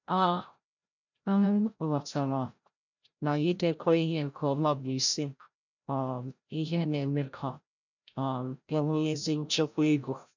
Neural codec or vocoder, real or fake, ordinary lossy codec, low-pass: codec, 16 kHz, 0.5 kbps, FreqCodec, larger model; fake; none; 7.2 kHz